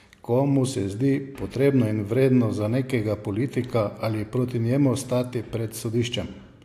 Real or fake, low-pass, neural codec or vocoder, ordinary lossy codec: real; 14.4 kHz; none; AAC, 64 kbps